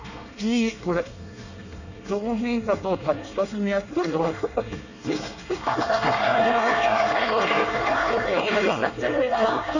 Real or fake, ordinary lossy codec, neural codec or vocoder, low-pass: fake; none; codec, 24 kHz, 1 kbps, SNAC; 7.2 kHz